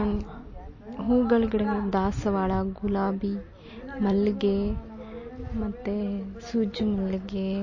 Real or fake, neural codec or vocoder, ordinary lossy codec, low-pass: real; none; MP3, 32 kbps; 7.2 kHz